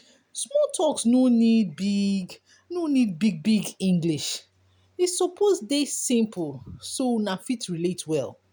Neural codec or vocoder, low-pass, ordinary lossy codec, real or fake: none; none; none; real